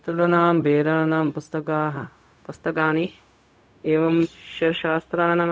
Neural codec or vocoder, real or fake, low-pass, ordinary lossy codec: codec, 16 kHz, 0.4 kbps, LongCat-Audio-Codec; fake; none; none